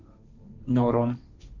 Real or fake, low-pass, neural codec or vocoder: fake; 7.2 kHz; codec, 16 kHz, 1.1 kbps, Voila-Tokenizer